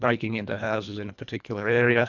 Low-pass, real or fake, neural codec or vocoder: 7.2 kHz; fake; codec, 24 kHz, 1.5 kbps, HILCodec